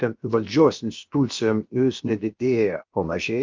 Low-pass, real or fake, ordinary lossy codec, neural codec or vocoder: 7.2 kHz; fake; Opus, 24 kbps; codec, 16 kHz, about 1 kbps, DyCAST, with the encoder's durations